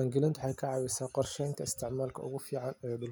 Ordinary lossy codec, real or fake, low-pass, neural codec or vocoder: none; real; none; none